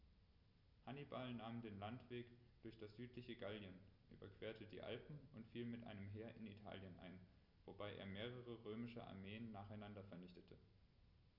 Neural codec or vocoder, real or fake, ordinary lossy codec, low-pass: none; real; none; 5.4 kHz